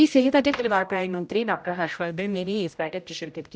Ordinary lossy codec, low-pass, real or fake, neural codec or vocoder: none; none; fake; codec, 16 kHz, 0.5 kbps, X-Codec, HuBERT features, trained on general audio